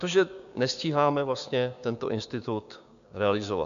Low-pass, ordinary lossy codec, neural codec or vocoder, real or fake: 7.2 kHz; MP3, 96 kbps; codec, 16 kHz, 6 kbps, DAC; fake